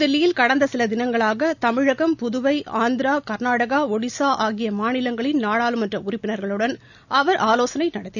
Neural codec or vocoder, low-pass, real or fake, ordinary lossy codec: none; 7.2 kHz; real; none